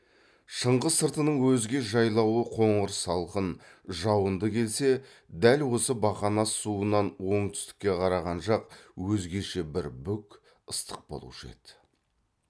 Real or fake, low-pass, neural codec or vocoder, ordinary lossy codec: real; none; none; none